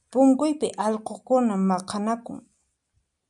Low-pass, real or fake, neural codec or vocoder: 10.8 kHz; real; none